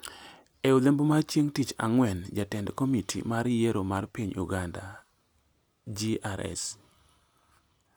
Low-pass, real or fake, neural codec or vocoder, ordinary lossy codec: none; real; none; none